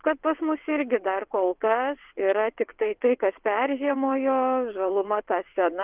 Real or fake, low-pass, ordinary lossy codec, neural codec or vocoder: fake; 3.6 kHz; Opus, 32 kbps; vocoder, 22.05 kHz, 80 mel bands, WaveNeXt